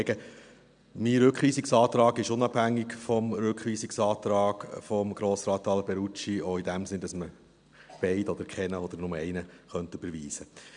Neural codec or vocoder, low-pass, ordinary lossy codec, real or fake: none; 9.9 kHz; none; real